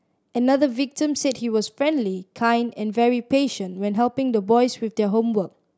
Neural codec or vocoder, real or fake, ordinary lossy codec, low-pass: none; real; none; none